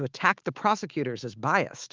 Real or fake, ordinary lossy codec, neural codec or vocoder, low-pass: fake; Opus, 32 kbps; autoencoder, 48 kHz, 128 numbers a frame, DAC-VAE, trained on Japanese speech; 7.2 kHz